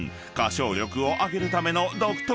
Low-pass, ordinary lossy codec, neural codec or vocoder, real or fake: none; none; none; real